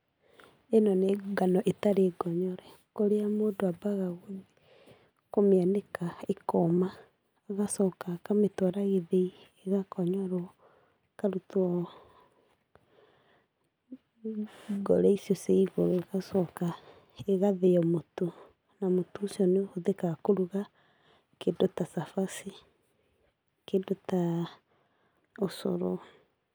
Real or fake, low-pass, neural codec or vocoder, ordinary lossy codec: real; none; none; none